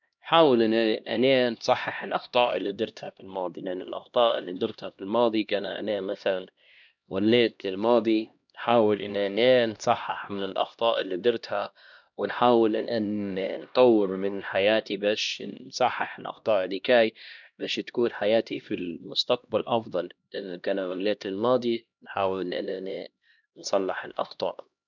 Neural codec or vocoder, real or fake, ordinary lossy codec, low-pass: codec, 16 kHz, 1 kbps, X-Codec, HuBERT features, trained on LibriSpeech; fake; none; 7.2 kHz